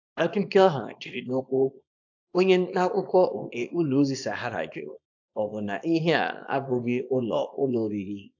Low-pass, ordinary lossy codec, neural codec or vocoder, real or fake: 7.2 kHz; AAC, 48 kbps; codec, 24 kHz, 0.9 kbps, WavTokenizer, small release; fake